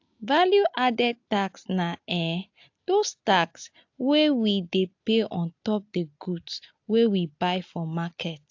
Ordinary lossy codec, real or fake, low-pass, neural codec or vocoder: AAC, 48 kbps; real; 7.2 kHz; none